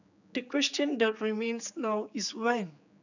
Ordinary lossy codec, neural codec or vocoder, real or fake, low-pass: none; codec, 16 kHz, 4 kbps, X-Codec, HuBERT features, trained on general audio; fake; 7.2 kHz